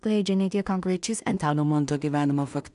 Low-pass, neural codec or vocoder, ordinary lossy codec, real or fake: 10.8 kHz; codec, 16 kHz in and 24 kHz out, 0.4 kbps, LongCat-Audio-Codec, two codebook decoder; MP3, 96 kbps; fake